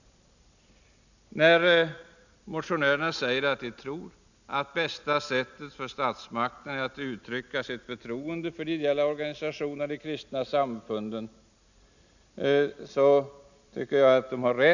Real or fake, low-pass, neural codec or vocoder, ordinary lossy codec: real; 7.2 kHz; none; none